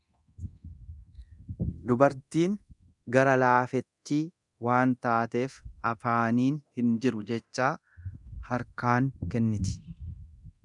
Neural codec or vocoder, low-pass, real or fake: codec, 24 kHz, 0.9 kbps, DualCodec; 10.8 kHz; fake